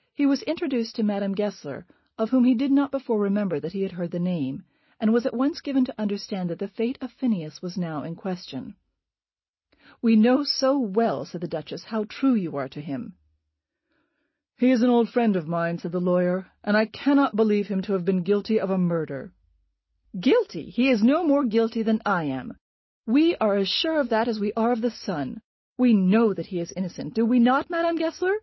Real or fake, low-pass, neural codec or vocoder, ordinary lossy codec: real; 7.2 kHz; none; MP3, 24 kbps